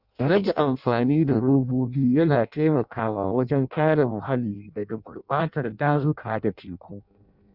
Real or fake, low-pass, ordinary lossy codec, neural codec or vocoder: fake; 5.4 kHz; none; codec, 16 kHz in and 24 kHz out, 0.6 kbps, FireRedTTS-2 codec